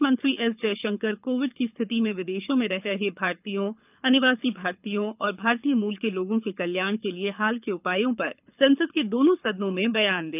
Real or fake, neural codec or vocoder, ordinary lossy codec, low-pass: fake; codec, 24 kHz, 6 kbps, HILCodec; none; 3.6 kHz